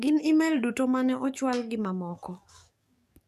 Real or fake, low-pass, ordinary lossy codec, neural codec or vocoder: fake; 14.4 kHz; none; codec, 44.1 kHz, 7.8 kbps, DAC